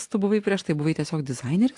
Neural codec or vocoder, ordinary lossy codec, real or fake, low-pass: none; AAC, 64 kbps; real; 10.8 kHz